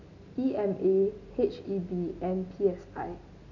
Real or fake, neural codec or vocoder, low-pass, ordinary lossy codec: real; none; 7.2 kHz; none